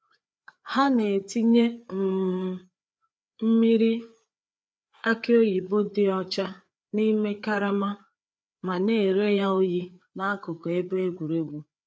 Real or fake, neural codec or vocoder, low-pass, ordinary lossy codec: fake; codec, 16 kHz, 4 kbps, FreqCodec, larger model; none; none